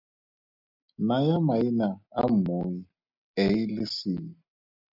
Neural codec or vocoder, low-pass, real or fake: none; 5.4 kHz; real